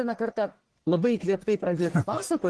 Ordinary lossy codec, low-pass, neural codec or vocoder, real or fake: Opus, 16 kbps; 10.8 kHz; codec, 44.1 kHz, 1.7 kbps, Pupu-Codec; fake